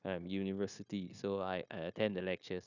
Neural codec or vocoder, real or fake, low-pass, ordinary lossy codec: codec, 16 kHz, 0.9 kbps, LongCat-Audio-Codec; fake; 7.2 kHz; none